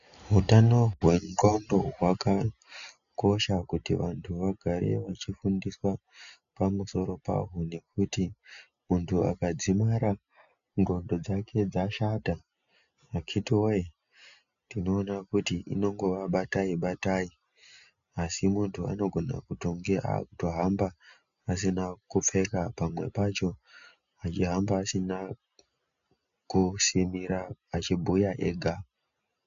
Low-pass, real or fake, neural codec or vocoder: 7.2 kHz; real; none